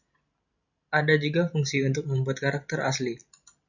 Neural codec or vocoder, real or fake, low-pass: none; real; 7.2 kHz